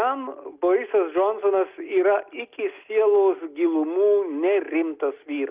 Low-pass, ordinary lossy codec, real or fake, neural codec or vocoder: 3.6 kHz; Opus, 24 kbps; real; none